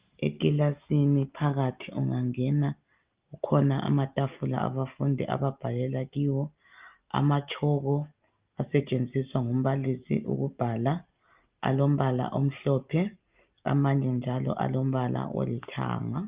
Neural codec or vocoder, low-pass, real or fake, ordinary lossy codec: none; 3.6 kHz; real; Opus, 32 kbps